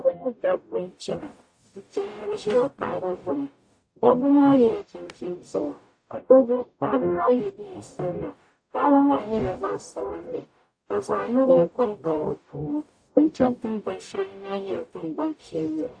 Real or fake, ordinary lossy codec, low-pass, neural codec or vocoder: fake; AAC, 64 kbps; 9.9 kHz; codec, 44.1 kHz, 0.9 kbps, DAC